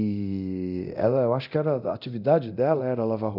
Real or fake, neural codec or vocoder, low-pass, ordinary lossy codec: fake; codec, 24 kHz, 0.9 kbps, DualCodec; 5.4 kHz; none